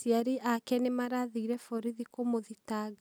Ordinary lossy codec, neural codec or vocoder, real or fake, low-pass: none; none; real; none